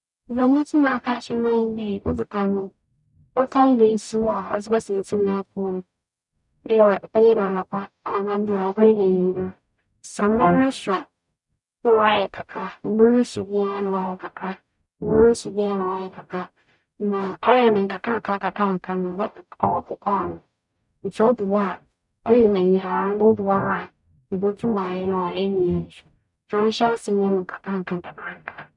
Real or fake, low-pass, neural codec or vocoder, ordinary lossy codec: fake; 10.8 kHz; codec, 44.1 kHz, 0.9 kbps, DAC; none